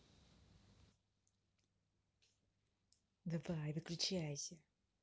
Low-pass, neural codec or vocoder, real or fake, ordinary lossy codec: none; none; real; none